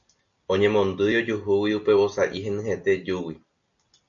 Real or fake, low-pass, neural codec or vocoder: real; 7.2 kHz; none